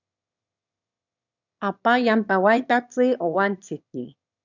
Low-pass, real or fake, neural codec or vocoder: 7.2 kHz; fake; autoencoder, 22.05 kHz, a latent of 192 numbers a frame, VITS, trained on one speaker